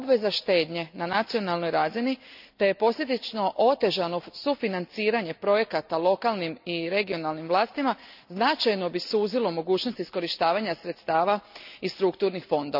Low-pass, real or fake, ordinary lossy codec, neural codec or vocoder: 5.4 kHz; real; none; none